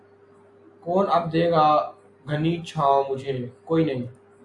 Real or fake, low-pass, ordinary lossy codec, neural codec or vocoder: real; 10.8 kHz; AAC, 64 kbps; none